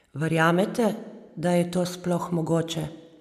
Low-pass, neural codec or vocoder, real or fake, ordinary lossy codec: 14.4 kHz; none; real; none